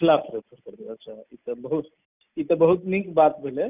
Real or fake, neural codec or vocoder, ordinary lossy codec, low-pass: real; none; none; 3.6 kHz